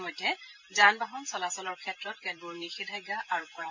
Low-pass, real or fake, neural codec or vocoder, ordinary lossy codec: 7.2 kHz; fake; vocoder, 44.1 kHz, 128 mel bands every 512 samples, BigVGAN v2; MP3, 48 kbps